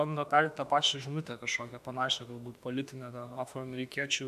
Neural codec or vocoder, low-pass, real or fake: autoencoder, 48 kHz, 32 numbers a frame, DAC-VAE, trained on Japanese speech; 14.4 kHz; fake